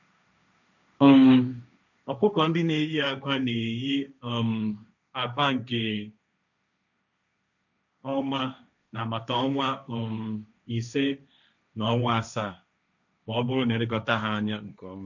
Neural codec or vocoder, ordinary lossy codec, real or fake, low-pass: codec, 16 kHz, 1.1 kbps, Voila-Tokenizer; none; fake; 7.2 kHz